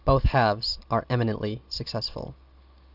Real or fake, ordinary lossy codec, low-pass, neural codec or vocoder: real; Opus, 64 kbps; 5.4 kHz; none